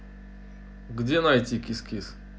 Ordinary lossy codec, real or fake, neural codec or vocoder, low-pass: none; real; none; none